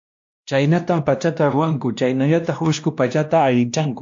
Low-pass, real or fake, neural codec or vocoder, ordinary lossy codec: 7.2 kHz; fake; codec, 16 kHz, 1 kbps, X-Codec, WavLM features, trained on Multilingual LibriSpeech; AAC, 64 kbps